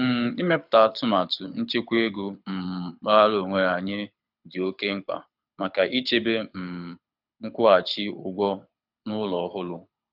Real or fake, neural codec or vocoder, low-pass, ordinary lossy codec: fake; codec, 24 kHz, 6 kbps, HILCodec; 5.4 kHz; none